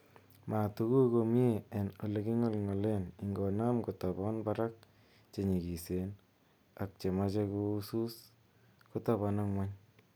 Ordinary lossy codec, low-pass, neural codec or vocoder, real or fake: none; none; none; real